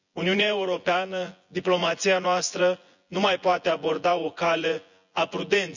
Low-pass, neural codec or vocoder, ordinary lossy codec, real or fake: 7.2 kHz; vocoder, 24 kHz, 100 mel bands, Vocos; none; fake